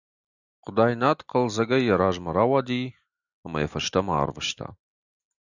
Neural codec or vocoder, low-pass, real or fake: none; 7.2 kHz; real